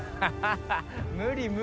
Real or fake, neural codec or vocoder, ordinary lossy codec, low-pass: real; none; none; none